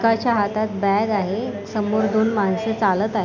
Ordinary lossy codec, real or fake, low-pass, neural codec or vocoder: MP3, 64 kbps; real; 7.2 kHz; none